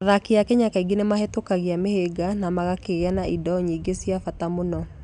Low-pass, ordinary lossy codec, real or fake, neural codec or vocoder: 10.8 kHz; none; real; none